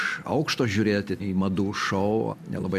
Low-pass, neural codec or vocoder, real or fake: 14.4 kHz; none; real